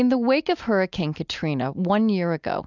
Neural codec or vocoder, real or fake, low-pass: none; real; 7.2 kHz